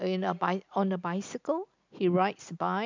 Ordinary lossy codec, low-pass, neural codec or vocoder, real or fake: MP3, 64 kbps; 7.2 kHz; none; real